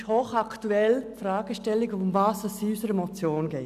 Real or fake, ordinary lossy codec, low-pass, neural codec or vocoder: real; none; 14.4 kHz; none